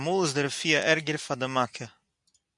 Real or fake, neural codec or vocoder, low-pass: real; none; 10.8 kHz